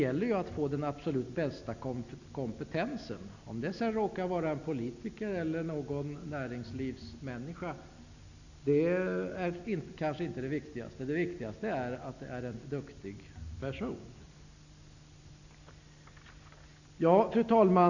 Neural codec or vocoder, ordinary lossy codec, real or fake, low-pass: none; none; real; 7.2 kHz